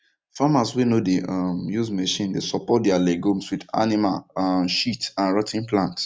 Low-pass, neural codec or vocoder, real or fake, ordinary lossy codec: none; none; real; none